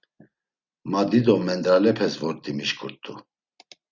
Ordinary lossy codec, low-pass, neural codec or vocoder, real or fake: Opus, 64 kbps; 7.2 kHz; none; real